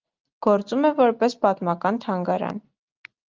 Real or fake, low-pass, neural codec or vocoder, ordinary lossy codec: real; 7.2 kHz; none; Opus, 32 kbps